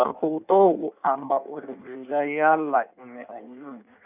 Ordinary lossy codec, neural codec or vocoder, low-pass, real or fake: none; codec, 16 kHz in and 24 kHz out, 1.1 kbps, FireRedTTS-2 codec; 3.6 kHz; fake